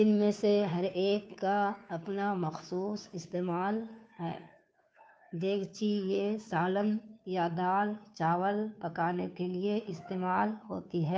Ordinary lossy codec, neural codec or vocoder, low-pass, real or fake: none; codec, 16 kHz, 2 kbps, FunCodec, trained on Chinese and English, 25 frames a second; none; fake